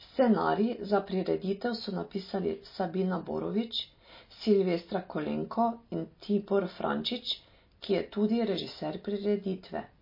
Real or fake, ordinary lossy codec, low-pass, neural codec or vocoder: real; MP3, 24 kbps; 5.4 kHz; none